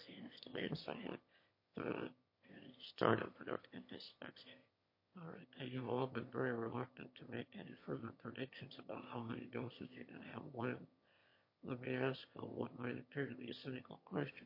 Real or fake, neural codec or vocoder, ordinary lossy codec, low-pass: fake; autoencoder, 22.05 kHz, a latent of 192 numbers a frame, VITS, trained on one speaker; MP3, 32 kbps; 5.4 kHz